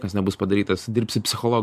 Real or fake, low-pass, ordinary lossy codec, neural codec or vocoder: fake; 14.4 kHz; MP3, 64 kbps; vocoder, 44.1 kHz, 128 mel bands every 512 samples, BigVGAN v2